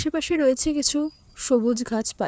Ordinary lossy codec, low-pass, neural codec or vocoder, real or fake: none; none; codec, 16 kHz, 4 kbps, FreqCodec, larger model; fake